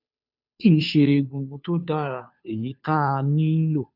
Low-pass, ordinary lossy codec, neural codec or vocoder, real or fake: 5.4 kHz; none; codec, 16 kHz, 2 kbps, FunCodec, trained on Chinese and English, 25 frames a second; fake